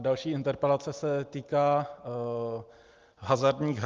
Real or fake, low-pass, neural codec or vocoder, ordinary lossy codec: real; 7.2 kHz; none; Opus, 32 kbps